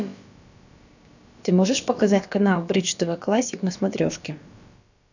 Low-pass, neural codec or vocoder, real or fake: 7.2 kHz; codec, 16 kHz, about 1 kbps, DyCAST, with the encoder's durations; fake